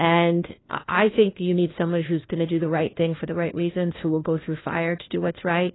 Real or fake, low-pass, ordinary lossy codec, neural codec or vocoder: fake; 7.2 kHz; AAC, 16 kbps; codec, 16 kHz, 1 kbps, FunCodec, trained on LibriTTS, 50 frames a second